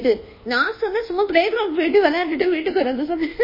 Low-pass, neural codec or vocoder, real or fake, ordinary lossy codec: 5.4 kHz; codec, 24 kHz, 1.2 kbps, DualCodec; fake; MP3, 24 kbps